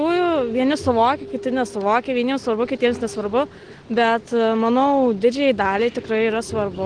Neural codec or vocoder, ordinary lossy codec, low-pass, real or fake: none; Opus, 16 kbps; 9.9 kHz; real